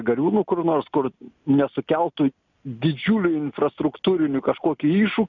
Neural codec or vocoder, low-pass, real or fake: none; 7.2 kHz; real